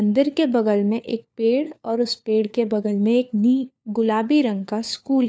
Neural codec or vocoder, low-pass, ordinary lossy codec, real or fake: codec, 16 kHz, 4 kbps, FunCodec, trained on LibriTTS, 50 frames a second; none; none; fake